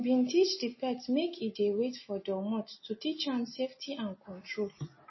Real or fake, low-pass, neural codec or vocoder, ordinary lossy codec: real; 7.2 kHz; none; MP3, 24 kbps